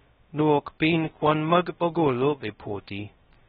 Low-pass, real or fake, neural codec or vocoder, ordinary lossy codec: 7.2 kHz; fake; codec, 16 kHz, 0.2 kbps, FocalCodec; AAC, 16 kbps